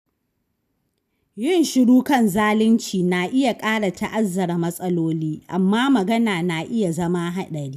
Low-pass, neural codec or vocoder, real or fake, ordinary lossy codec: 14.4 kHz; none; real; none